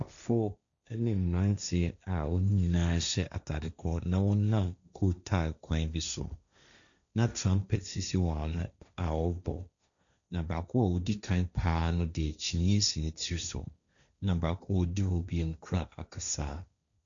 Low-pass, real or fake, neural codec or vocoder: 7.2 kHz; fake; codec, 16 kHz, 1.1 kbps, Voila-Tokenizer